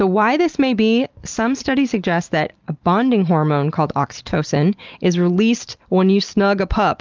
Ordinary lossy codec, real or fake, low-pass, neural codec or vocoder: Opus, 24 kbps; real; 7.2 kHz; none